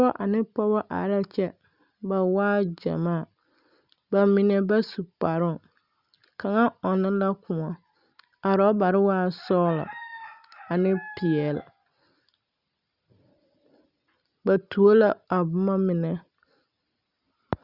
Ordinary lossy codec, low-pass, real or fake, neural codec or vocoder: Opus, 64 kbps; 5.4 kHz; real; none